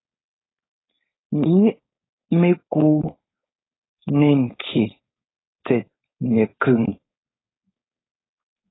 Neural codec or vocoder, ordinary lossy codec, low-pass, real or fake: codec, 16 kHz, 4.8 kbps, FACodec; AAC, 16 kbps; 7.2 kHz; fake